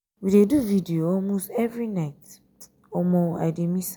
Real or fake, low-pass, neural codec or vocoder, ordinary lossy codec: real; none; none; none